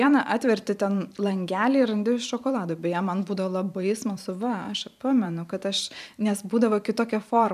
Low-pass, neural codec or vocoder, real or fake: 14.4 kHz; vocoder, 44.1 kHz, 128 mel bands every 512 samples, BigVGAN v2; fake